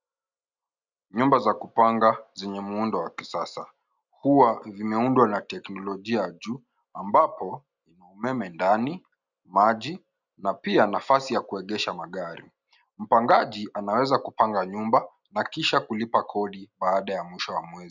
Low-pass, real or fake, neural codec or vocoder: 7.2 kHz; real; none